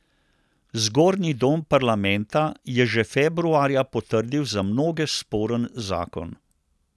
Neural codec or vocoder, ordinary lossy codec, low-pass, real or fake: none; none; none; real